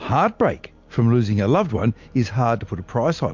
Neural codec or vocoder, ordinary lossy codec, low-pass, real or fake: none; MP3, 48 kbps; 7.2 kHz; real